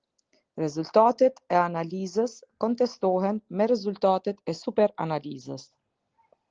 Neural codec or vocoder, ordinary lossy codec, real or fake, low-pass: none; Opus, 16 kbps; real; 7.2 kHz